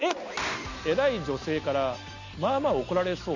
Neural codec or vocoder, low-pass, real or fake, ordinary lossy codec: none; 7.2 kHz; real; none